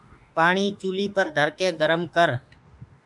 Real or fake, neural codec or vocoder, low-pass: fake; autoencoder, 48 kHz, 32 numbers a frame, DAC-VAE, trained on Japanese speech; 10.8 kHz